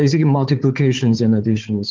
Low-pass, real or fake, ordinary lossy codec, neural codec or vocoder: 7.2 kHz; fake; Opus, 16 kbps; codec, 16 kHz, 4 kbps, FunCodec, trained on Chinese and English, 50 frames a second